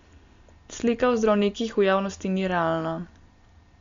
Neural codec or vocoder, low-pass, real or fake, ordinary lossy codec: none; 7.2 kHz; real; Opus, 64 kbps